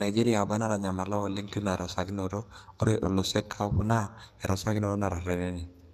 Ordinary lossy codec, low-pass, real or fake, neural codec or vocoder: none; 14.4 kHz; fake; codec, 44.1 kHz, 2.6 kbps, SNAC